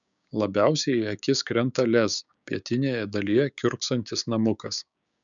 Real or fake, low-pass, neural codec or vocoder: fake; 7.2 kHz; codec, 16 kHz, 6 kbps, DAC